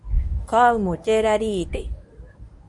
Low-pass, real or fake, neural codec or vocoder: 10.8 kHz; fake; codec, 24 kHz, 0.9 kbps, WavTokenizer, medium speech release version 1